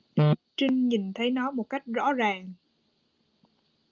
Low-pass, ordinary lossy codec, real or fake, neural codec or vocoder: 7.2 kHz; Opus, 32 kbps; real; none